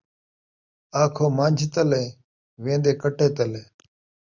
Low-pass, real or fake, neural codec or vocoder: 7.2 kHz; real; none